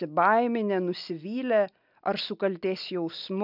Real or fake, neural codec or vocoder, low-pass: real; none; 5.4 kHz